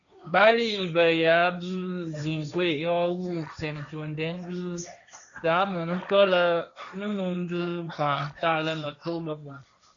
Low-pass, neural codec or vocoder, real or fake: 7.2 kHz; codec, 16 kHz, 1.1 kbps, Voila-Tokenizer; fake